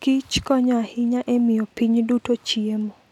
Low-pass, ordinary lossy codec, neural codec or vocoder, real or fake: 19.8 kHz; none; none; real